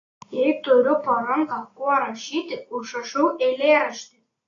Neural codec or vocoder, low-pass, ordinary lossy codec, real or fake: none; 7.2 kHz; AAC, 32 kbps; real